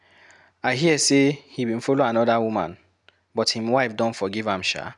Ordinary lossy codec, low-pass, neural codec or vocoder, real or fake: none; 10.8 kHz; none; real